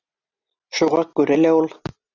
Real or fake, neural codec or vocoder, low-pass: real; none; 7.2 kHz